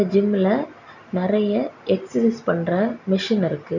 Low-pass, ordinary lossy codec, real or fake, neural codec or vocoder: 7.2 kHz; none; real; none